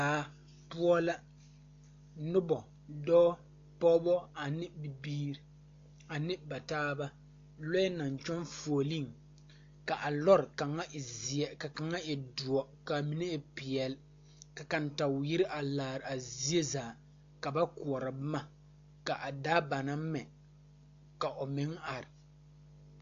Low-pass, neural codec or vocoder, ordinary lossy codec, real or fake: 7.2 kHz; none; AAC, 48 kbps; real